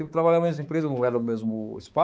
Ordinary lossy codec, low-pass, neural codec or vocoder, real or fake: none; none; codec, 16 kHz, 4 kbps, X-Codec, HuBERT features, trained on balanced general audio; fake